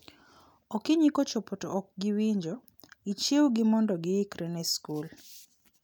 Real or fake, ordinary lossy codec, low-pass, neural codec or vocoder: real; none; none; none